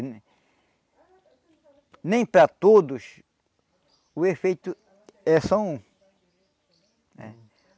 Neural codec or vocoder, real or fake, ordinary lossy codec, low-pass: none; real; none; none